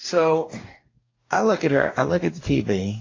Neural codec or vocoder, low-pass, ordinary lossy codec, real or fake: codec, 44.1 kHz, 2.6 kbps, DAC; 7.2 kHz; AAC, 32 kbps; fake